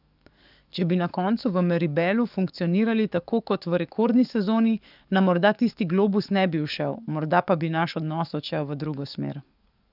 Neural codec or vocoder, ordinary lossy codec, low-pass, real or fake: codec, 44.1 kHz, 7.8 kbps, DAC; none; 5.4 kHz; fake